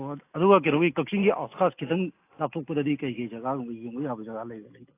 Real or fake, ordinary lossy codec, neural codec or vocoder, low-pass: real; AAC, 24 kbps; none; 3.6 kHz